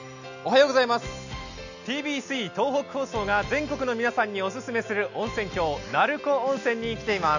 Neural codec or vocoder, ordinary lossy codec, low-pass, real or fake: none; none; 7.2 kHz; real